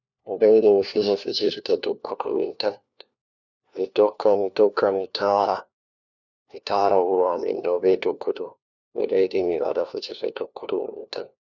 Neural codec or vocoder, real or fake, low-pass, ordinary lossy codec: codec, 16 kHz, 1 kbps, FunCodec, trained on LibriTTS, 50 frames a second; fake; 7.2 kHz; none